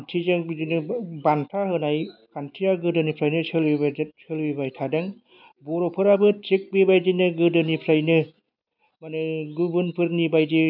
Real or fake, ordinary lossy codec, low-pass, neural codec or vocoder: real; none; 5.4 kHz; none